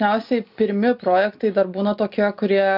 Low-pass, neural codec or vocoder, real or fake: 5.4 kHz; none; real